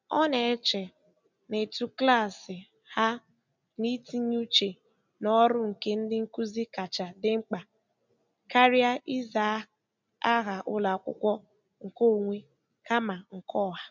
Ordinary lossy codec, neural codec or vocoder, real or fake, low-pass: none; none; real; 7.2 kHz